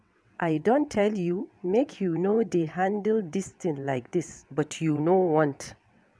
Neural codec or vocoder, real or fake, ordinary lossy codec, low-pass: vocoder, 22.05 kHz, 80 mel bands, WaveNeXt; fake; none; none